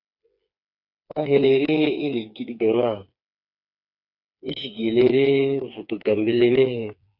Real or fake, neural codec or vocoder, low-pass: fake; codec, 16 kHz, 4 kbps, FreqCodec, smaller model; 5.4 kHz